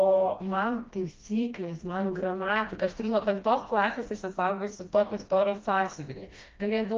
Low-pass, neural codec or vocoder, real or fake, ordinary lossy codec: 7.2 kHz; codec, 16 kHz, 1 kbps, FreqCodec, smaller model; fake; Opus, 24 kbps